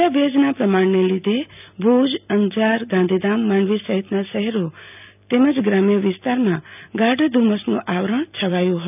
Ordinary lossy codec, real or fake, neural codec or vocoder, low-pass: none; real; none; 3.6 kHz